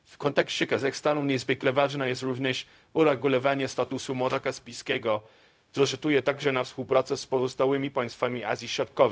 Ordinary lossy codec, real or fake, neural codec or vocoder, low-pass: none; fake; codec, 16 kHz, 0.4 kbps, LongCat-Audio-Codec; none